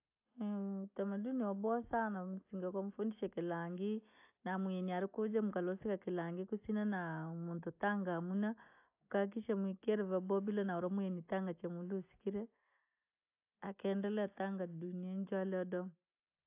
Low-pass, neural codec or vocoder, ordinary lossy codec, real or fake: 3.6 kHz; none; AAC, 32 kbps; real